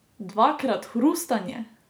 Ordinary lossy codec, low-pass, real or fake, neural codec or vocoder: none; none; real; none